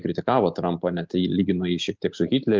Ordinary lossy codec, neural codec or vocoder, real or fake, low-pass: Opus, 32 kbps; none; real; 7.2 kHz